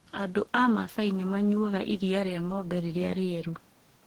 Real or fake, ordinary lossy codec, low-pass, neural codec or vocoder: fake; Opus, 16 kbps; 19.8 kHz; codec, 44.1 kHz, 2.6 kbps, DAC